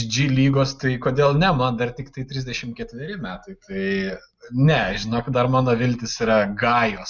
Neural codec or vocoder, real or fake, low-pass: none; real; 7.2 kHz